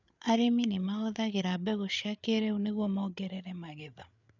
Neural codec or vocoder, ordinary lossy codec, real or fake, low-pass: codec, 16 kHz, 8 kbps, FreqCodec, larger model; none; fake; 7.2 kHz